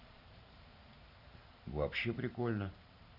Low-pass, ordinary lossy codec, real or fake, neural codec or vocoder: 5.4 kHz; none; fake; vocoder, 44.1 kHz, 128 mel bands every 512 samples, BigVGAN v2